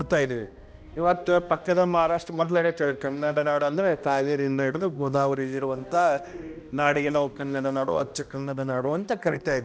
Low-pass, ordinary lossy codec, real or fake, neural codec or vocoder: none; none; fake; codec, 16 kHz, 1 kbps, X-Codec, HuBERT features, trained on balanced general audio